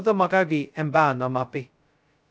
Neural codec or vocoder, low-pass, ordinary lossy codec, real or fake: codec, 16 kHz, 0.2 kbps, FocalCodec; none; none; fake